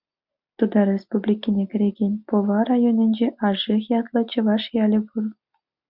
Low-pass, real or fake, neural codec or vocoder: 5.4 kHz; real; none